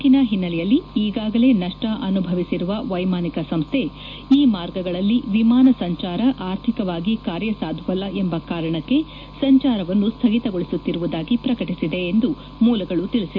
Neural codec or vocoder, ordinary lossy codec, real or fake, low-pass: none; none; real; 7.2 kHz